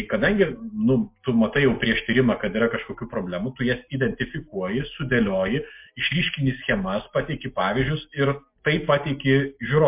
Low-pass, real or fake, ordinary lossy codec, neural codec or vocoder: 3.6 kHz; real; MP3, 32 kbps; none